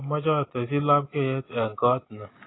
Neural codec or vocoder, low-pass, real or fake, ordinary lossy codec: none; 7.2 kHz; real; AAC, 16 kbps